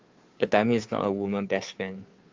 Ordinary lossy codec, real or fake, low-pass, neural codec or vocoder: Opus, 32 kbps; fake; 7.2 kHz; codec, 16 kHz, 2 kbps, FunCodec, trained on Chinese and English, 25 frames a second